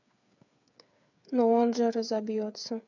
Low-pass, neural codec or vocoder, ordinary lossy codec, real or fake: 7.2 kHz; codec, 16 kHz, 8 kbps, FreqCodec, smaller model; none; fake